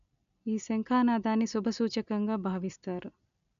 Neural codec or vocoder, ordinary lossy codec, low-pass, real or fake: none; none; 7.2 kHz; real